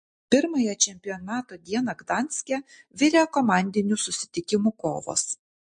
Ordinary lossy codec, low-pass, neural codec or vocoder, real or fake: MP3, 48 kbps; 9.9 kHz; none; real